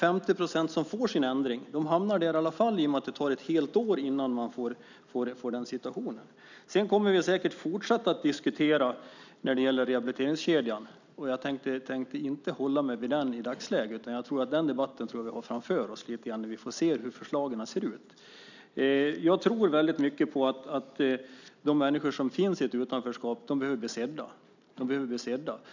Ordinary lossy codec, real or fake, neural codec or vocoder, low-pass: none; real; none; 7.2 kHz